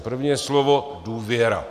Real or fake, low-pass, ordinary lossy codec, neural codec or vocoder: real; 14.4 kHz; Opus, 64 kbps; none